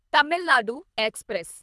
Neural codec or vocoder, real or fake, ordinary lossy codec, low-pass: codec, 24 kHz, 3 kbps, HILCodec; fake; none; none